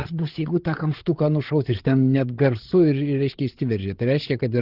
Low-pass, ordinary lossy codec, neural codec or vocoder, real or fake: 5.4 kHz; Opus, 16 kbps; codec, 16 kHz, 8 kbps, FreqCodec, larger model; fake